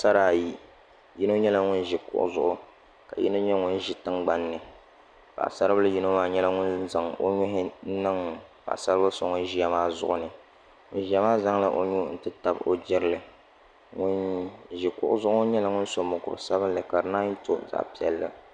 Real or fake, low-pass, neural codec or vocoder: real; 9.9 kHz; none